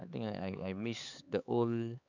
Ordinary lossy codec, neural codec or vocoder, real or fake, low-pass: none; codec, 16 kHz, 4 kbps, X-Codec, HuBERT features, trained on LibriSpeech; fake; 7.2 kHz